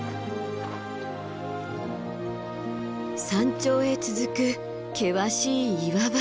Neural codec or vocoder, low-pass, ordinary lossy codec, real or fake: none; none; none; real